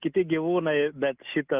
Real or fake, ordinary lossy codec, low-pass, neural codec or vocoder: real; Opus, 24 kbps; 3.6 kHz; none